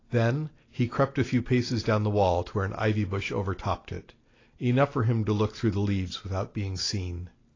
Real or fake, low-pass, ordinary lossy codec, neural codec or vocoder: real; 7.2 kHz; AAC, 32 kbps; none